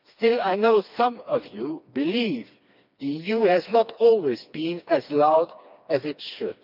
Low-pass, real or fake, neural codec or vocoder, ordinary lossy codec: 5.4 kHz; fake; codec, 16 kHz, 2 kbps, FreqCodec, smaller model; none